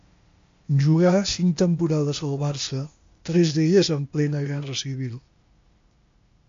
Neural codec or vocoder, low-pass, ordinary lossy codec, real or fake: codec, 16 kHz, 0.8 kbps, ZipCodec; 7.2 kHz; MP3, 48 kbps; fake